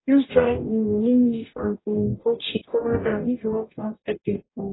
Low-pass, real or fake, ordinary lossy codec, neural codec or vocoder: 7.2 kHz; fake; AAC, 16 kbps; codec, 44.1 kHz, 0.9 kbps, DAC